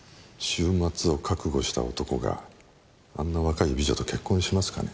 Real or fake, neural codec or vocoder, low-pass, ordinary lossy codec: real; none; none; none